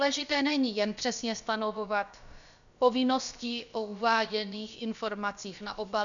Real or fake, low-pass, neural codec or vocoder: fake; 7.2 kHz; codec, 16 kHz, about 1 kbps, DyCAST, with the encoder's durations